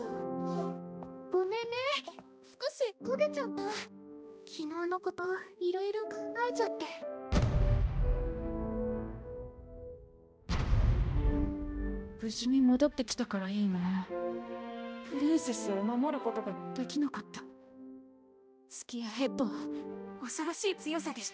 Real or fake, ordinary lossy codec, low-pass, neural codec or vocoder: fake; none; none; codec, 16 kHz, 1 kbps, X-Codec, HuBERT features, trained on balanced general audio